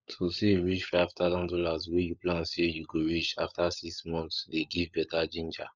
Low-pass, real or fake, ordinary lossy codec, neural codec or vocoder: 7.2 kHz; fake; none; codec, 16 kHz, 16 kbps, FunCodec, trained on LibriTTS, 50 frames a second